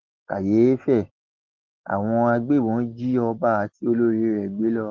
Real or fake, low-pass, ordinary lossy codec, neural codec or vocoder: real; 7.2 kHz; Opus, 16 kbps; none